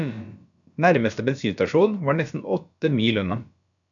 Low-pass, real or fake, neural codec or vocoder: 7.2 kHz; fake; codec, 16 kHz, about 1 kbps, DyCAST, with the encoder's durations